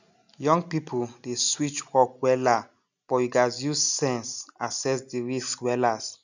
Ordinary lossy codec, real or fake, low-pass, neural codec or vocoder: none; real; 7.2 kHz; none